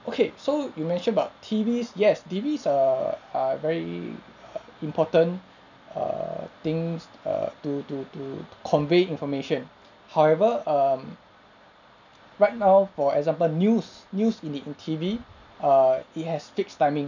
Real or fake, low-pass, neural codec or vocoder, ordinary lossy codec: real; 7.2 kHz; none; none